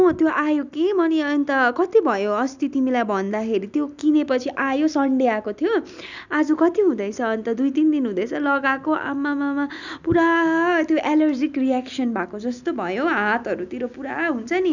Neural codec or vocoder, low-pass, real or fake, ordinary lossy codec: none; 7.2 kHz; real; none